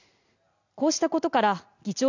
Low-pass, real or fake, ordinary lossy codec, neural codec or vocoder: 7.2 kHz; real; none; none